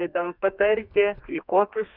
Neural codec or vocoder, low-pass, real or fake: codec, 32 kHz, 1.9 kbps, SNAC; 5.4 kHz; fake